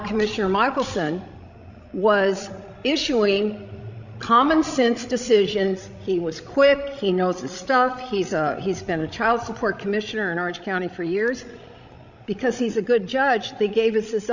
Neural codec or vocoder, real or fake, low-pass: codec, 16 kHz, 16 kbps, FreqCodec, larger model; fake; 7.2 kHz